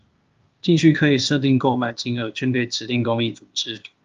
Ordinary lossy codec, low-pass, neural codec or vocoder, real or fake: Opus, 32 kbps; 7.2 kHz; codec, 16 kHz, 0.8 kbps, ZipCodec; fake